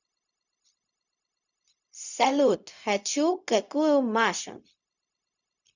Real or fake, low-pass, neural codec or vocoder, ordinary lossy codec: fake; 7.2 kHz; codec, 16 kHz, 0.4 kbps, LongCat-Audio-Codec; none